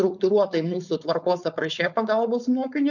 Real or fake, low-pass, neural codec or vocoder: fake; 7.2 kHz; codec, 16 kHz, 4.8 kbps, FACodec